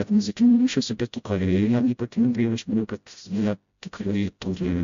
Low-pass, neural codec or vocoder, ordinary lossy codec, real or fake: 7.2 kHz; codec, 16 kHz, 0.5 kbps, FreqCodec, smaller model; MP3, 64 kbps; fake